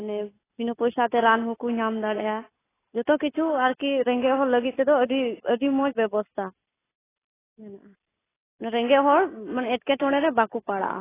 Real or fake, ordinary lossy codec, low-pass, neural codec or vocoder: real; AAC, 16 kbps; 3.6 kHz; none